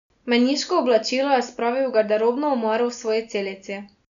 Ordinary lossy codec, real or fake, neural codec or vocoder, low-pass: none; real; none; 7.2 kHz